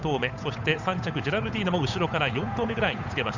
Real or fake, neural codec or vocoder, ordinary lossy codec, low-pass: fake; codec, 16 kHz, 8 kbps, FunCodec, trained on Chinese and English, 25 frames a second; none; 7.2 kHz